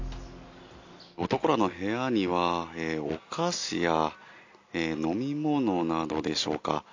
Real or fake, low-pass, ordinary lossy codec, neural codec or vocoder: real; 7.2 kHz; AAC, 48 kbps; none